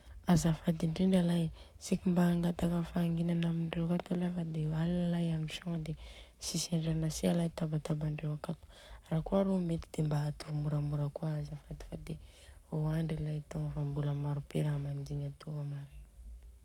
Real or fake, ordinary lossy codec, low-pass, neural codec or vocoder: fake; none; 19.8 kHz; codec, 44.1 kHz, 7.8 kbps, Pupu-Codec